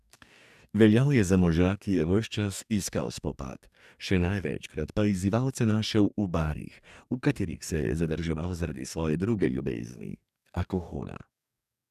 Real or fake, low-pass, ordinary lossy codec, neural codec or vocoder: fake; 14.4 kHz; none; codec, 44.1 kHz, 2.6 kbps, DAC